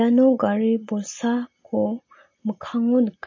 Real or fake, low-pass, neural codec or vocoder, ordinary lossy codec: real; 7.2 kHz; none; MP3, 32 kbps